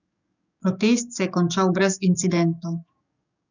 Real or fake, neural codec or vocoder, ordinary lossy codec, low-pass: fake; codec, 44.1 kHz, 7.8 kbps, DAC; none; 7.2 kHz